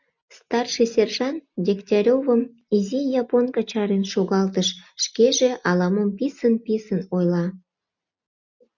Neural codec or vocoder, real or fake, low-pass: none; real; 7.2 kHz